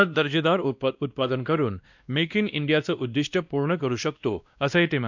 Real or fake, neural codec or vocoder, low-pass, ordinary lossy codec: fake; codec, 16 kHz, 1 kbps, X-Codec, WavLM features, trained on Multilingual LibriSpeech; 7.2 kHz; none